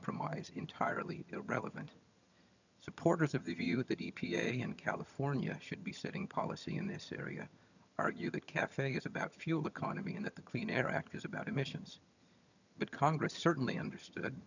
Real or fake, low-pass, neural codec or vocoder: fake; 7.2 kHz; vocoder, 22.05 kHz, 80 mel bands, HiFi-GAN